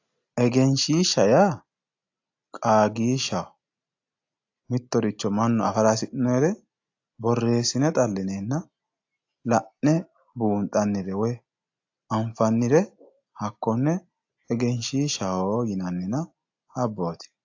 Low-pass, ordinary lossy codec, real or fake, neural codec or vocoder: 7.2 kHz; AAC, 48 kbps; real; none